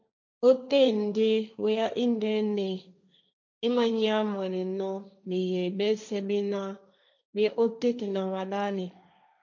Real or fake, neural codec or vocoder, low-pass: fake; codec, 16 kHz, 1.1 kbps, Voila-Tokenizer; 7.2 kHz